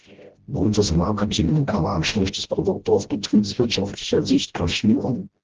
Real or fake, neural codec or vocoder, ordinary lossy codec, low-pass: fake; codec, 16 kHz, 0.5 kbps, FreqCodec, smaller model; Opus, 16 kbps; 7.2 kHz